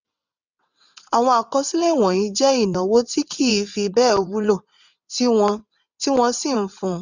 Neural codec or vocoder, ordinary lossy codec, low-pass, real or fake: vocoder, 22.05 kHz, 80 mel bands, WaveNeXt; none; 7.2 kHz; fake